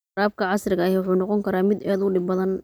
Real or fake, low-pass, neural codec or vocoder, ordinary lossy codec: real; none; none; none